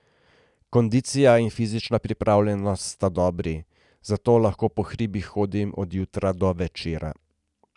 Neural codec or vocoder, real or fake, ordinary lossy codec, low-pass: none; real; none; 10.8 kHz